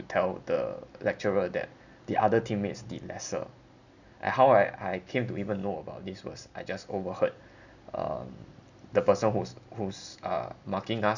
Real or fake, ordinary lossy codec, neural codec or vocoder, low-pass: real; none; none; 7.2 kHz